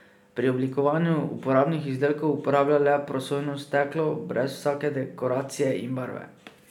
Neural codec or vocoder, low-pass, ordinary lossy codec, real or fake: none; 19.8 kHz; none; real